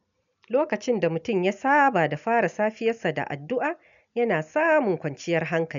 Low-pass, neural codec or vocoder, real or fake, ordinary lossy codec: 7.2 kHz; none; real; none